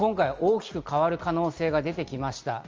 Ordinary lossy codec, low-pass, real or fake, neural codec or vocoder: Opus, 24 kbps; 7.2 kHz; real; none